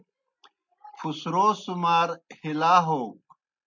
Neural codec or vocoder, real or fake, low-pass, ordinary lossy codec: none; real; 7.2 kHz; MP3, 64 kbps